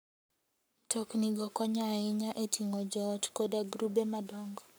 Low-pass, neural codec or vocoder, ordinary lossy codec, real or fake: none; codec, 44.1 kHz, 7.8 kbps, Pupu-Codec; none; fake